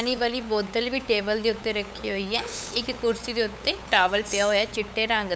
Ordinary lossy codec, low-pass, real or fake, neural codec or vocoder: none; none; fake; codec, 16 kHz, 8 kbps, FunCodec, trained on LibriTTS, 25 frames a second